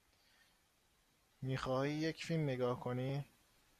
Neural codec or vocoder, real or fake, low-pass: none; real; 14.4 kHz